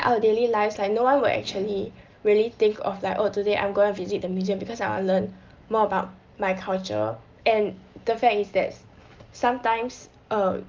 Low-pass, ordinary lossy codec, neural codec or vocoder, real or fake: 7.2 kHz; Opus, 24 kbps; none; real